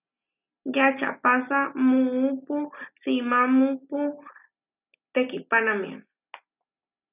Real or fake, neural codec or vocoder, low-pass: real; none; 3.6 kHz